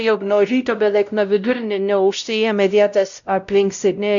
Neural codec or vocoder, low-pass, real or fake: codec, 16 kHz, 0.5 kbps, X-Codec, WavLM features, trained on Multilingual LibriSpeech; 7.2 kHz; fake